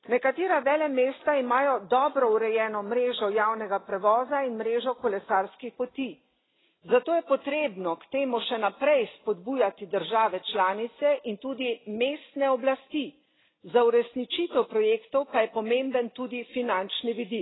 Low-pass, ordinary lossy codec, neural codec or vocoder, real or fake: 7.2 kHz; AAC, 16 kbps; none; real